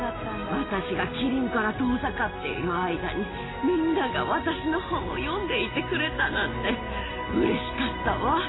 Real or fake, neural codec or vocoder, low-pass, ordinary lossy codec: real; none; 7.2 kHz; AAC, 16 kbps